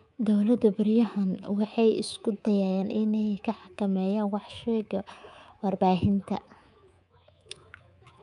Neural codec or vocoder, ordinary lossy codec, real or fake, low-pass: codec, 24 kHz, 3.1 kbps, DualCodec; none; fake; 10.8 kHz